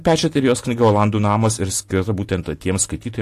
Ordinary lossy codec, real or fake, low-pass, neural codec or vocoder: AAC, 64 kbps; real; 14.4 kHz; none